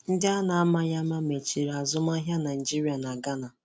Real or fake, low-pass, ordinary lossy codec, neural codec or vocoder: real; none; none; none